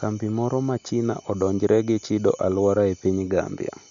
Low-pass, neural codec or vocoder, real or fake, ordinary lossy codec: 7.2 kHz; none; real; none